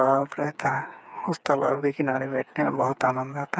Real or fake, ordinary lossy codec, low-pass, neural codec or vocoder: fake; none; none; codec, 16 kHz, 4 kbps, FreqCodec, smaller model